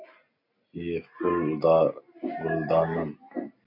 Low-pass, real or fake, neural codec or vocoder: 5.4 kHz; real; none